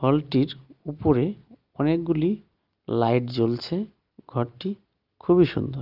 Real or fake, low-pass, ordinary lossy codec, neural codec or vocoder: real; 5.4 kHz; Opus, 32 kbps; none